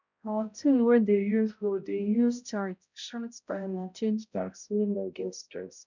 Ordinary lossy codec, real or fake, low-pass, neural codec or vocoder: none; fake; 7.2 kHz; codec, 16 kHz, 0.5 kbps, X-Codec, HuBERT features, trained on balanced general audio